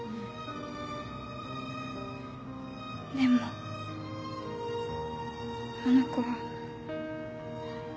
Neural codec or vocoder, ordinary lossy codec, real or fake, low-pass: none; none; real; none